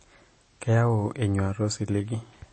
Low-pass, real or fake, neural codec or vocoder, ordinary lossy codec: 9.9 kHz; real; none; MP3, 32 kbps